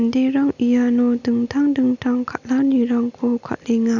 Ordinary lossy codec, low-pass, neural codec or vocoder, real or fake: none; 7.2 kHz; none; real